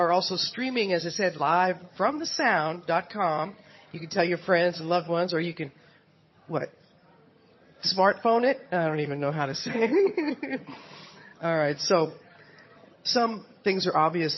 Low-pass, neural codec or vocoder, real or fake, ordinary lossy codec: 7.2 kHz; vocoder, 22.05 kHz, 80 mel bands, HiFi-GAN; fake; MP3, 24 kbps